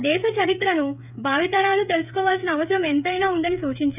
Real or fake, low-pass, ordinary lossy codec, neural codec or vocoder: fake; 3.6 kHz; none; codec, 16 kHz, 8 kbps, FreqCodec, smaller model